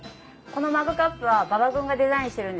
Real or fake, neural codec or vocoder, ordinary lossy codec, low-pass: real; none; none; none